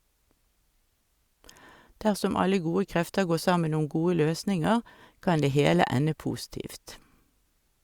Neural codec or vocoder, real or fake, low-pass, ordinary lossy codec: none; real; 19.8 kHz; Opus, 64 kbps